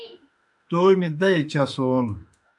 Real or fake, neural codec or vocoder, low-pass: fake; autoencoder, 48 kHz, 32 numbers a frame, DAC-VAE, trained on Japanese speech; 10.8 kHz